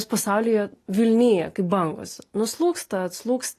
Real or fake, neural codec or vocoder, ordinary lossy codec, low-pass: real; none; AAC, 48 kbps; 14.4 kHz